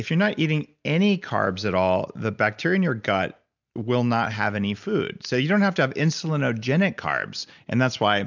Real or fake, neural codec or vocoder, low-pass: real; none; 7.2 kHz